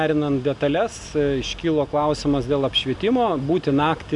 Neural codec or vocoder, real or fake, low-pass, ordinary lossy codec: none; real; 10.8 kHz; MP3, 96 kbps